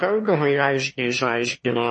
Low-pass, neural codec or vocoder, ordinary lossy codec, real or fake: 9.9 kHz; autoencoder, 22.05 kHz, a latent of 192 numbers a frame, VITS, trained on one speaker; MP3, 32 kbps; fake